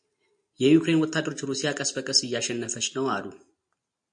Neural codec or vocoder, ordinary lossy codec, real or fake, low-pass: none; MP3, 48 kbps; real; 9.9 kHz